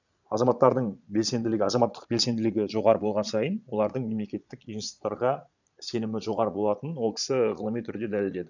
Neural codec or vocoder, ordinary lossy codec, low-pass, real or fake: vocoder, 22.05 kHz, 80 mel bands, Vocos; none; 7.2 kHz; fake